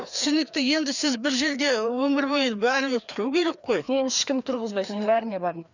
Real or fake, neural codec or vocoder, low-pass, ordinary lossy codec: fake; codec, 16 kHz, 2 kbps, FreqCodec, larger model; 7.2 kHz; none